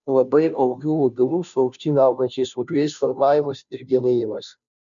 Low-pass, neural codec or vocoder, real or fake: 7.2 kHz; codec, 16 kHz, 0.5 kbps, FunCodec, trained on Chinese and English, 25 frames a second; fake